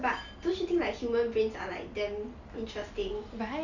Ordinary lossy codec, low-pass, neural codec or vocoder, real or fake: none; 7.2 kHz; none; real